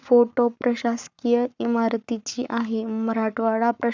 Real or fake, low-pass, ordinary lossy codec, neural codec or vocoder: fake; 7.2 kHz; none; codec, 44.1 kHz, 7.8 kbps, Pupu-Codec